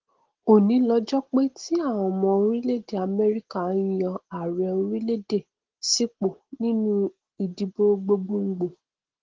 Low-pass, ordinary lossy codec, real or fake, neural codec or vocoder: 7.2 kHz; Opus, 16 kbps; real; none